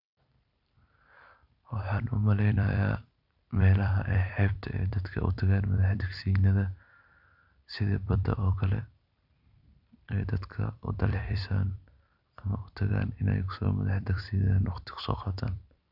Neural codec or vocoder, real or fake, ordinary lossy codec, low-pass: vocoder, 44.1 kHz, 128 mel bands every 256 samples, BigVGAN v2; fake; none; 5.4 kHz